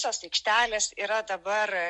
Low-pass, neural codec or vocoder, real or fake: 10.8 kHz; none; real